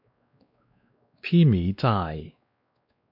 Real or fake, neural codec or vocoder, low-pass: fake; codec, 16 kHz, 2 kbps, X-Codec, WavLM features, trained on Multilingual LibriSpeech; 5.4 kHz